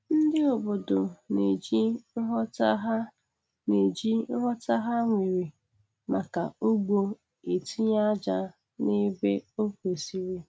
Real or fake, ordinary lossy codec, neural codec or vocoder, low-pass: real; none; none; none